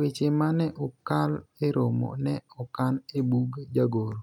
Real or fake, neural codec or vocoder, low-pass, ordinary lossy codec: real; none; 19.8 kHz; none